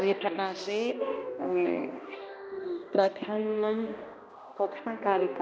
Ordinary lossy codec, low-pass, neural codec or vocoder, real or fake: none; none; codec, 16 kHz, 1 kbps, X-Codec, HuBERT features, trained on balanced general audio; fake